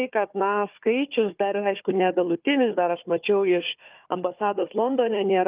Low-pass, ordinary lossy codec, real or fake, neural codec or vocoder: 3.6 kHz; Opus, 24 kbps; fake; codec, 16 kHz, 4 kbps, FunCodec, trained on Chinese and English, 50 frames a second